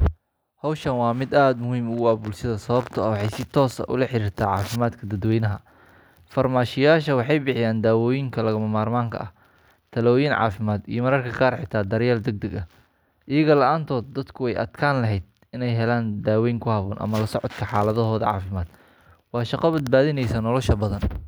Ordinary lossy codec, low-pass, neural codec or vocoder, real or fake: none; none; none; real